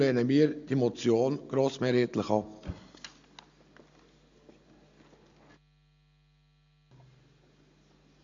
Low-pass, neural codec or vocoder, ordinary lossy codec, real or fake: 7.2 kHz; none; AAC, 64 kbps; real